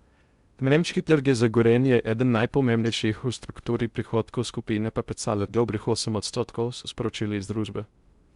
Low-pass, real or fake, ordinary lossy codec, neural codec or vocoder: 10.8 kHz; fake; Opus, 64 kbps; codec, 16 kHz in and 24 kHz out, 0.6 kbps, FocalCodec, streaming, 2048 codes